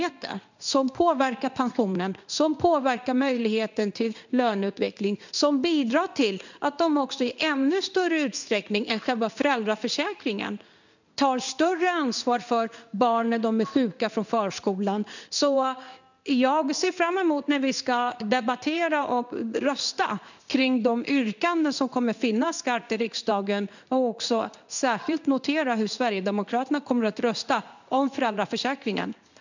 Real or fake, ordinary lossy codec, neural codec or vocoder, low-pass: fake; none; codec, 16 kHz in and 24 kHz out, 1 kbps, XY-Tokenizer; 7.2 kHz